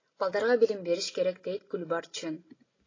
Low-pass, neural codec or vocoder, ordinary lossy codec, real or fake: 7.2 kHz; none; AAC, 32 kbps; real